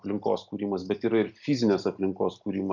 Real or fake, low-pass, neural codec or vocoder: real; 7.2 kHz; none